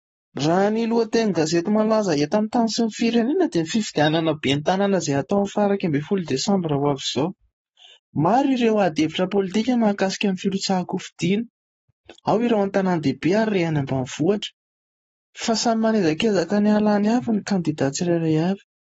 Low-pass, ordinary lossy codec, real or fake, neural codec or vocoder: 19.8 kHz; AAC, 24 kbps; fake; codec, 44.1 kHz, 7.8 kbps, Pupu-Codec